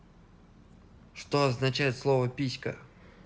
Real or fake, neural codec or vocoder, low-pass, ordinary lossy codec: real; none; none; none